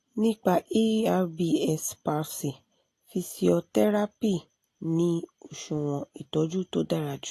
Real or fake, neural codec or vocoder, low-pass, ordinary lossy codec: real; none; 14.4 kHz; AAC, 48 kbps